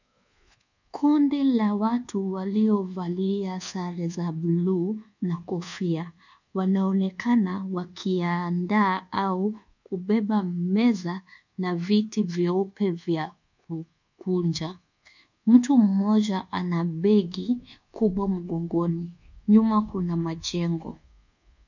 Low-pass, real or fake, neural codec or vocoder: 7.2 kHz; fake; codec, 24 kHz, 1.2 kbps, DualCodec